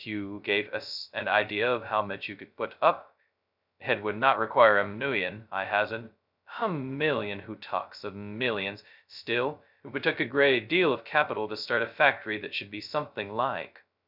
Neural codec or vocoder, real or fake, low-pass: codec, 16 kHz, 0.2 kbps, FocalCodec; fake; 5.4 kHz